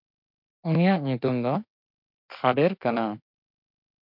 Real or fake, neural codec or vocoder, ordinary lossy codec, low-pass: fake; autoencoder, 48 kHz, 32 numbers a frame, DAC-VAE, trained on Japanese speech; MP3, 48 kbps; 5.4 kHz